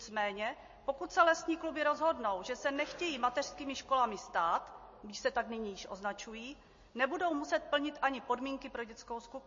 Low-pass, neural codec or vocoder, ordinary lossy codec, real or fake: 7.2 kHz; none; MP3, 32 kbps; real